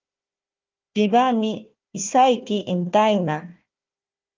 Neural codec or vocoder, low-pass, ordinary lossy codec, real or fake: codec, 16 kHz, 1 kbps, FunCodec, trained on Chinese and English, 50 frames a second; 7.2 kHz; Opus, 24 kbps; fake